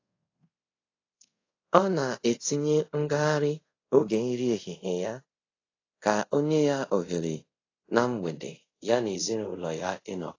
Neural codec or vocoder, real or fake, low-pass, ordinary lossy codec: codec, 24 kHz, 0.5 kbps, DualCodec; fake; 7.2 kHz; AAC, 32 kbps